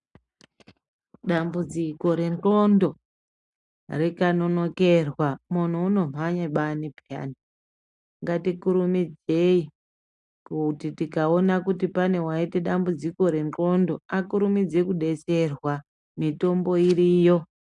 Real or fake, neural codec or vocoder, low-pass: real; none; 10.8 kHz